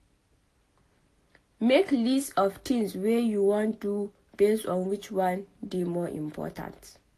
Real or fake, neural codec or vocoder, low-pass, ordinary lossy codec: real; none; 14.4 kHz; AAC, 48 kbps